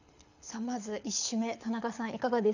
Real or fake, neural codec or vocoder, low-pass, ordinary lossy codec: fake; codec, 24 kHz, 6 kbps, HILCodec; 7.2 kHz; none